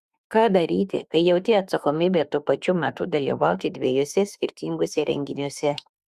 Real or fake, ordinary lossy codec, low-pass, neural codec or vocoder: fake; Opus, 64 kbps; 14.4 kHz; autoencoder, 48 kHz, 32 numbers a frame, DAC-VAE, trained on Japanese speech